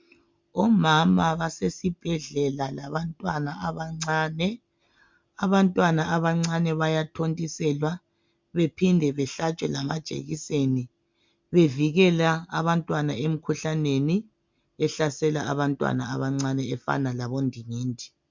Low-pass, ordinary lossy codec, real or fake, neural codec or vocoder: 7.2 kHz; MP3, 64 kbps; real; none